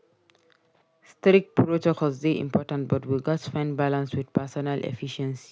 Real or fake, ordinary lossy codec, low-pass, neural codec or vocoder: real; none; none; none